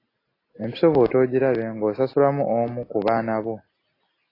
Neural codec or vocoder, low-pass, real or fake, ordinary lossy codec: none; 5.4 kHz; real; AAC, 24 kbps